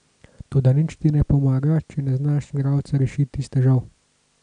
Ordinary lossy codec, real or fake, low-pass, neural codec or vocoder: none; real; 9.9 kHz; none